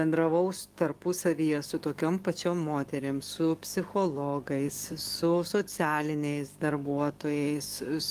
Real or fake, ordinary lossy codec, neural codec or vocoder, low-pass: fake; Opus, 32 kbps; codec, 44.1 kHz, 7.8 kbps, DAC; 14.4 kHz